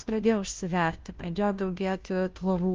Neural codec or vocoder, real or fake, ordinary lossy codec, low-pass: codec, 16 kHz, 0.5 kbps, FunCodec, trained on Chinese and English, 25 frames a second; fake; Opus, 32 kbps; 7.2 kHz